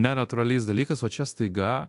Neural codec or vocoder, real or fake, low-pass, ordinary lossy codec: codec, 24 kHz, 0.9 kbps, DualCodec; fake; 10.8 kHz; AAC, 64 kbps